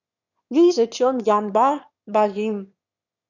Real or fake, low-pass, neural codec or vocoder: fake; 7.2 kHz; autoencoder, 22.05 kHz, a latent of 192 numbers a frame, VITS, trained on one speaker